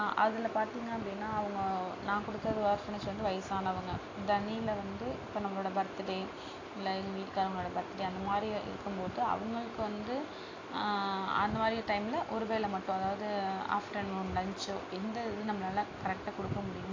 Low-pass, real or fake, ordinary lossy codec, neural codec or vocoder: 7.2 kHz; real; AAC, 32 kbps; none